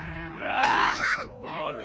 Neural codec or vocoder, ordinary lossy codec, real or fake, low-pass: codec, 16 kHz, 1 kbps, FreqCodec, larger model; none; fake; none